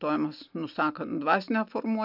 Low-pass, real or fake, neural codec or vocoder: 5.4 kHz; real; none